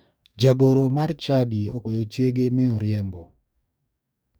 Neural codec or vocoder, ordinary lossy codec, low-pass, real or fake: codec, 44.1 kHz, 2.6 kbps, DAC; none; none; fake